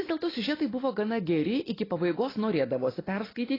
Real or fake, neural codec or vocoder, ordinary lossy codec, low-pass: real; none; AAC, 24 kbps; 5.4 kHz